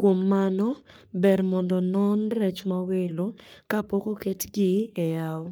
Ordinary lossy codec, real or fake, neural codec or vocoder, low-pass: none; fake; codec, 44.1 kHz, 3.4 kbps, Pupu-Codec; none